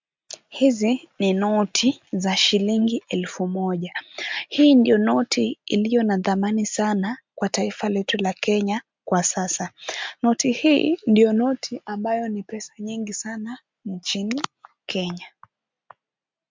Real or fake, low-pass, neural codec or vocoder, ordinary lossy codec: real; 7.2 kHz; none; MP3, 64 kbps